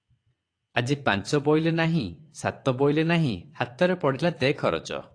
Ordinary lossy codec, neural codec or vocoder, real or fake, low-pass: AAC, 48 kbps; vocoder, 22.05 kHz, 80 mel bands, WaveNeXt; fake; 9.9 kHz